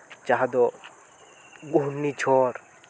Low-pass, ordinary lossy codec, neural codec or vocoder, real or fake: none; none; none; real